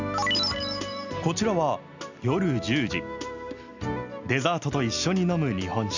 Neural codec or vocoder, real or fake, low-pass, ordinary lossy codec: none; real; 7.2 kHz; none